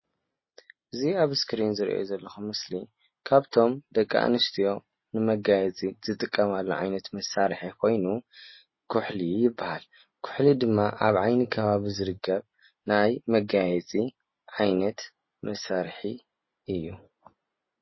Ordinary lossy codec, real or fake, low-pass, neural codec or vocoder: MP3, 24 kbps; real; 7.2 kHz; none